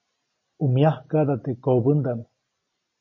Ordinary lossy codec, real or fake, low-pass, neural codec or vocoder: MP3, 32 kbps; real; 7.2 kHz; none